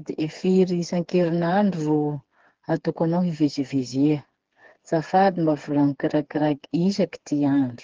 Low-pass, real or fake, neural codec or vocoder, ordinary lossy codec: 7.2 kHz; fake; codec, 16 kHz, 4 kbps, FreqCodec, smaller model; Opus, 16 kbps